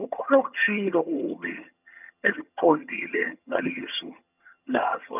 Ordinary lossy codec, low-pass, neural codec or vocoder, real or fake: none; 3.6 kHz; vocoder, 22.05 kHz, 80 mel bands, HiFi-GAN; fake